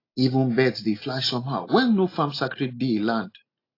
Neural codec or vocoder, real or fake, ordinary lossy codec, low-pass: none; real; AAC, 32 kbps; 5.4 kHz